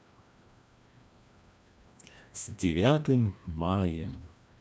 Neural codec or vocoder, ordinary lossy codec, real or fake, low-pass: codec, 16 kHz, 1 kbps, FreqCodec, larger model; none; fake; none